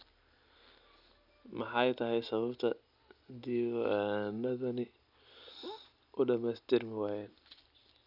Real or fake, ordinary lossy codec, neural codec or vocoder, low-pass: real; none; none; 5.4 kHz